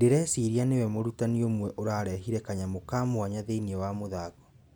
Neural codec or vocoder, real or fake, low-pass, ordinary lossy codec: none; real; none; none